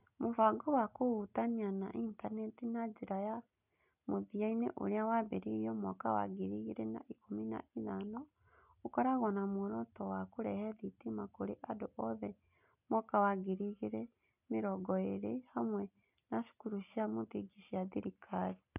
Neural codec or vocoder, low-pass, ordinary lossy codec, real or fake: none; 3.6 kHz; none; real